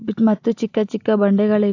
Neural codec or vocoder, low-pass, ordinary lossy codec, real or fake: none; 7.2 kHz; AAC, 48 kbps; real